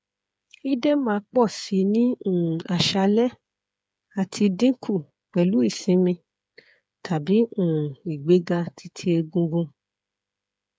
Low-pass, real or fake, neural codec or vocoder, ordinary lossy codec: none; fake; codec, 16 kHz, 8 kbps, FreqCodec, smaller model; none